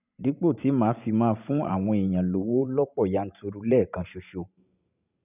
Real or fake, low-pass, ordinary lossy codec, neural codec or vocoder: fake; 3.6 kHz; none; vocoder, 44.1 kHz, 128 mel bands every 512 samples, BigVGAN v2